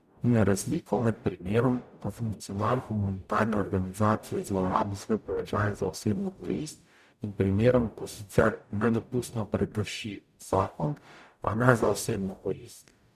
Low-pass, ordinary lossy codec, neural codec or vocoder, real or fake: 14.4 kHz; none; codec, 44.1 kHz, 0.9 kbps, DAC; fake